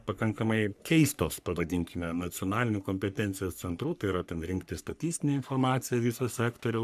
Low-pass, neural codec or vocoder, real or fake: 14.4 kHz; codec, 44.1 kHz, 3.4 kbps, Pupu-Codec; fake